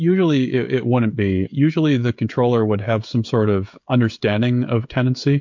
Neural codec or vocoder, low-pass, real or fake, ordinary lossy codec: codec, 16 kHz, 16 kbps, FreqCodec, smaller model; 7.2 kHz; fake; MP3, 48 kbps